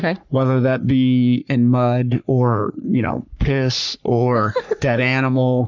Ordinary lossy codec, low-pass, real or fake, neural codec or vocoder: MP3, 64 kbps; 7.2 kHz; fake; codec, 44.1 kHz, 3.4 kbps, Pupu-Codec